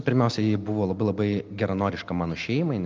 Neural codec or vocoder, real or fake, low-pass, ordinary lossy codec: none; real; 7.2 kHz; Opus, 24 kbps